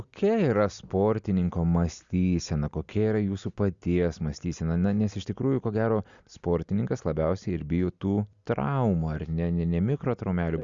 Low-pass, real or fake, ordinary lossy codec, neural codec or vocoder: 7.2 kHz; real; Opus, 64 kbps; none